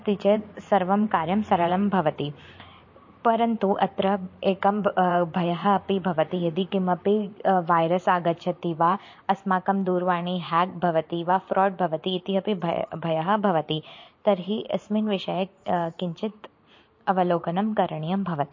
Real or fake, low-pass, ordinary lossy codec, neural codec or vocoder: fake; 7.2 kHz; MP3, 32 kbps; vocoder, 44.1 kHz, 128 mel bands every 512 samples, BigVGAN v2